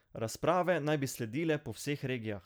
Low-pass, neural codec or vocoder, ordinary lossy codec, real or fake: none; none; none; real